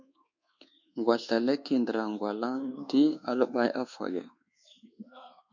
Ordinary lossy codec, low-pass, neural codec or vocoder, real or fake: MP3, 64 kbps; 7.2 kHz; codec, 24 kHz, 1.2 kbps, DualCodec; fake